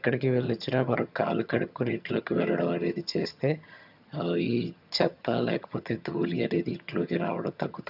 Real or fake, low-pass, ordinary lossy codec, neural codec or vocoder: fake; 5.4 kHz; none; vocoder, 22.05 kHz, 80 mel bands, HiFi-GAN